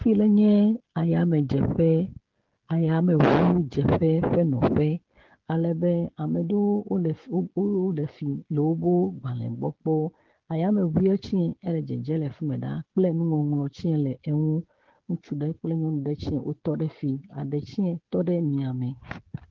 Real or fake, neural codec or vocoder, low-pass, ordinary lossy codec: fake; codec, 16 kHz, 16 kbps, FreqCodec, smaller model; 7.2 kHz; Opus, 16 kbps